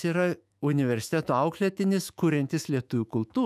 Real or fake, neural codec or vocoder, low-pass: fake; autoencoder, 48 kHz, 128 numbers a frame, DAC-VAE, trained on Japanese speech; 14.4 kHz